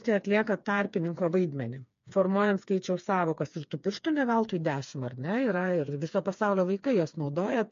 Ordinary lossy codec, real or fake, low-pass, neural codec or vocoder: MP3, 48 kbps; fake; 7.2 kHz; codec, 16 kHz, 4 kbps, FreqCodec, smaller model